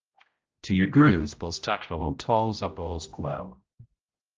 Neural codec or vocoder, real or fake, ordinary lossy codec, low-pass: codec, 16 kHz, 0.5 kbps, X-Codec, HuBERT features, trained on general audio; fake; Opus, 24 kbps; 7.2 kHz